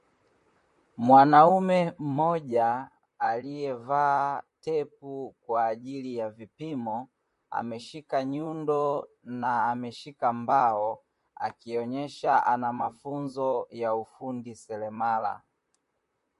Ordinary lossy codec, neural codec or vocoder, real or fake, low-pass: MP3, 48 kbps; vocoder, 44.1 kHz, 128 mel bands, Pupu-Vocoder; fake; 14.4 kHz